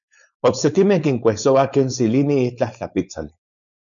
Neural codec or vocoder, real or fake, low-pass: codec, 16 kHz, 4.8 kbps, FACodec; fake; 7.2 kHz